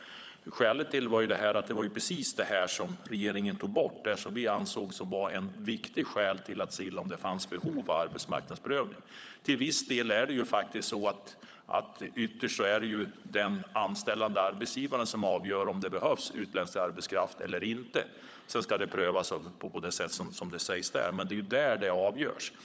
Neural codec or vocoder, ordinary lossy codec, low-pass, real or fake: codec, 16 kHz, 16 kbps, FunCodec, trained on LibriTTS, 50 frames a second; none; none; fake